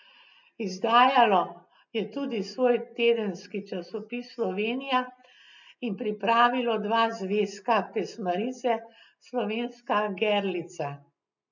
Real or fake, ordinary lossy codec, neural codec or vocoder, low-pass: real; none; none; 7.2 kHz